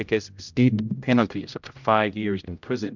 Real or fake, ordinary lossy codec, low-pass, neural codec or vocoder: fake; MP3, 64 kbps; 7.2 kHz; codec, 16 kHz, 0.5 kbps, X-Codec, HuBERT features, trained on general audio